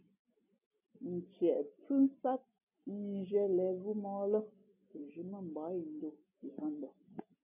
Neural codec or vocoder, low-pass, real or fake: none; 3.6 kHz; real